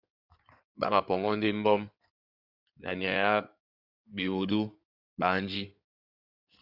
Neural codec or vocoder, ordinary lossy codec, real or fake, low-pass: codec, 16 kHz in and 24 kHz out, 2.2 kbps, FireRedTTS-2 codec; Opus, 64 kbps; fake; 5.4 kHz